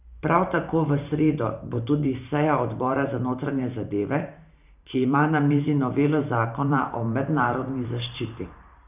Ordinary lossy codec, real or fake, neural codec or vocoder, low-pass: none; fake; vocoder, 44.1 kHz, 128 mel bands every 512 samples, BigVGAN v2; 3.6 kHz